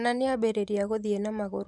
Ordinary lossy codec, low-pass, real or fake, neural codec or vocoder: none; 10.8 kHz; fake; vocoder, 44.1 kHz, 128 mel bands every 512 samples, BigVGAN v2